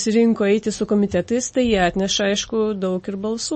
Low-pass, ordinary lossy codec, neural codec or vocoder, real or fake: 10.8 kHz; MP3, 32 kbps; none; real